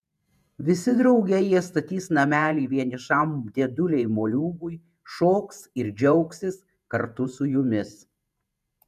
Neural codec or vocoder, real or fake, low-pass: vocoder, 48 kHz, 128 mel bands, Vocos; fake; 14.4 kHz